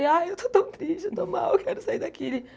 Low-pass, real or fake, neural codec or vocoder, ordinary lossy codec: none; real; none; none